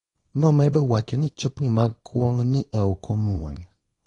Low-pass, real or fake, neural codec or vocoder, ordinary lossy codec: 10.8 kHz; fake; codec, 24 kHz, 0.9 kbps, WavTokenizer, small release; AAC, 32 kbps